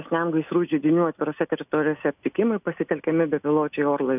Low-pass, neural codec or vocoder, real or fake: 3.6 kHz; none; real